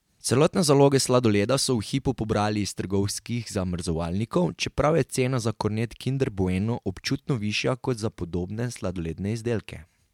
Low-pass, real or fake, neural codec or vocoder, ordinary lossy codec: 19.8 kHz; real; none; MP3, 96 kbps